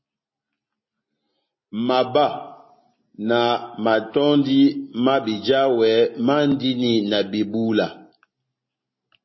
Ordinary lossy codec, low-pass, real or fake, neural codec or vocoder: MP3, 24 kbps; 7.2 kHz; real; none